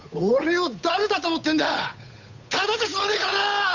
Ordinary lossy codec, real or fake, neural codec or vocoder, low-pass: none; fake; codec, 16 kHz, 8 kbps, FunCodec, trained on Chinese and English, 25 frames a second; 7.2 kHz